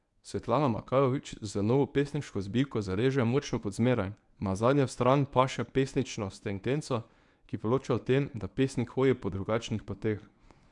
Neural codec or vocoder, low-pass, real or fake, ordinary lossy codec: codec, 24 kHz, 0.9 kbps, WavTokenizer, medium speech release version 1; 10.8 kHz; fake; none